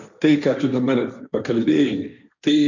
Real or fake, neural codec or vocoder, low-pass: fake; codec, 16 kHz, 1.1 kbps, Voila-Tokenizer; 7.2 kHz